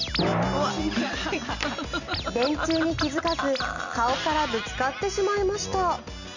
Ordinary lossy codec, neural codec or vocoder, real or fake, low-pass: none; none; real; 7.2 kHz